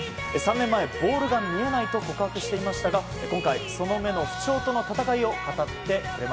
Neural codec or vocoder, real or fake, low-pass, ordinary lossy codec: none; real; none; none